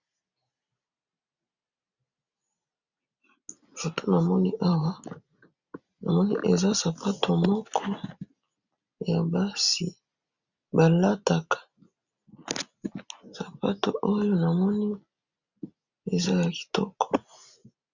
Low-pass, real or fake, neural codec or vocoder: 7.2 kHz; real; none